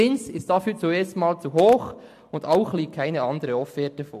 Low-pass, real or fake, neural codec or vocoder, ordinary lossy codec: 14.4 kHz; real; none; MP3, 64 kbps